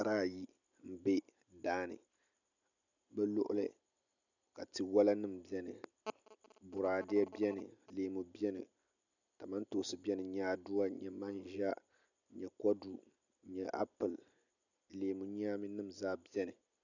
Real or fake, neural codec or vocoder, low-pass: real; none; 7.2 kHz